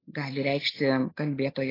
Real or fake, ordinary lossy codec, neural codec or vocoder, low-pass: real; AAC, 24 kbps; none; 5.4 kHz